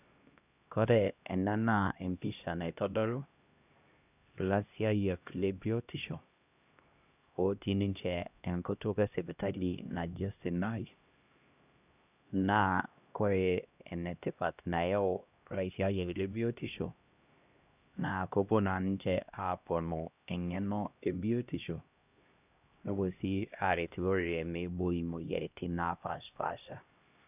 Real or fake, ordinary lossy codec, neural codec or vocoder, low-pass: fake; none; codec, 16 kHz, 1 kbps, X-Codec, HuBERT features, trained on LibriSpeech; 3.6 kHz